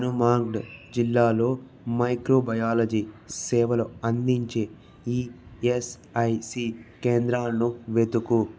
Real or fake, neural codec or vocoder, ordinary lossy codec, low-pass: real; none; none; none